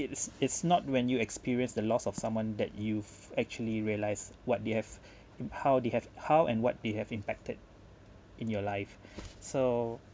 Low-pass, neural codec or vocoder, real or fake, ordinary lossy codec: none; none; real; none